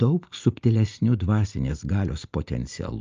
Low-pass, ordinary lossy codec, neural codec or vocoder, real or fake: 7.2 kHz; Opus, 24 kbps; none; real